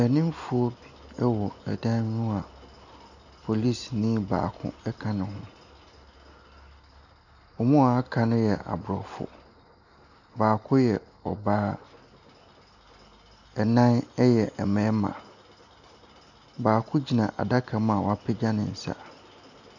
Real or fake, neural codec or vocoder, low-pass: real; none; 7.2 kHz